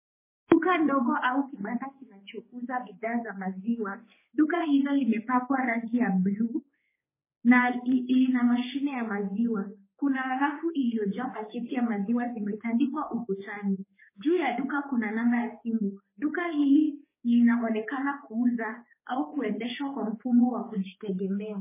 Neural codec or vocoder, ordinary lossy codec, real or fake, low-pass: codec, 16 kHz, 4 kbps, X-Codec, HuBERT features, trained on general audio; MP3, 16 kbps; fake; 3.6 kHz